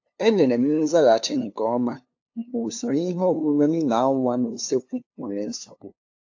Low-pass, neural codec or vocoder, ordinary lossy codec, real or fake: 7.2 kHz; codec, 16 kHz, 2 kbps, FunCodec, trained on LibriTTS, 25 frames a second; AAC, 48 kbps; fake